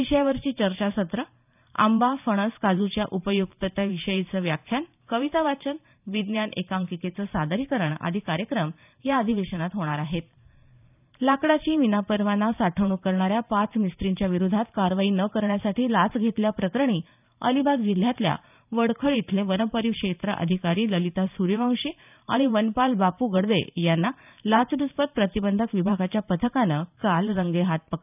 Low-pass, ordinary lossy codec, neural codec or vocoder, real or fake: 3.6 kHz; none; vocoder, 44.1 kHz, 128 mel bands every 512 samples, BigVGAN v2; fake